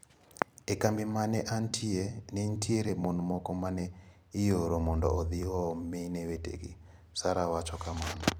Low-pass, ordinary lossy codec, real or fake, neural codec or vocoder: none; none; fake; vocoder, 44.1 kHz, 128 mel bands every 256 samples, BigVGAN v2